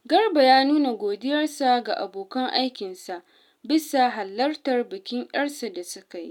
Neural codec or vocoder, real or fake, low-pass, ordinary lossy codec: none; real; 19.8 kHz; none